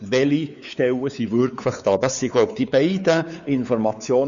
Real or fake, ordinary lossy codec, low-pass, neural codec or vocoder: fake; none; 7.2 kHz; codec, 16 kHz, 4 kbps, X-Codec, WavLM features, trained on Multilingual LibriSpeech